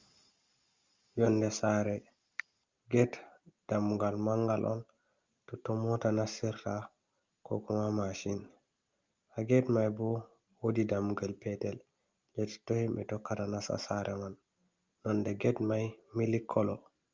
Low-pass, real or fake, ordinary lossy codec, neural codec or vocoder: 7.2 kHz; real; Opus, 32 kbps; none